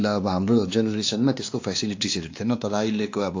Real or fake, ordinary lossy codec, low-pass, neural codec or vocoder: fake; AAC, 48 kbps; 7.2 kHz; codec, 16 kHz, 2 kbps, X-Codec, WavLM features, trained on Multilingual LibriSpeech